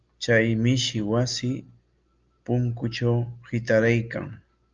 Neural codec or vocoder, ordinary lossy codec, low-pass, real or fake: none; Opus, 24 kbps; 7.2 kHz; real